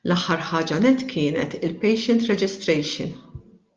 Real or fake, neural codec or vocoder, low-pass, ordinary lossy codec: real; none; 7.2 kHz; Opus, 24 kbps